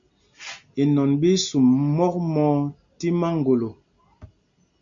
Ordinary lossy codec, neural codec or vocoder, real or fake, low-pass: MP3, 64 kbps; none; real; 7.2 kHz